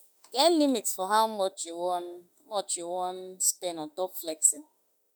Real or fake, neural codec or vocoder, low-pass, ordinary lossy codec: fake; autoencoder, 48 kHz, 32 numbers a frame, DAC-VAE, trained on Japanese speech; none; none